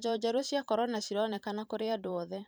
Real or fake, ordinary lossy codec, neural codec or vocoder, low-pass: fake; none; vocoder, 44.1 kHz, 128 mel bands every 512 samples, BigVGAN v2; none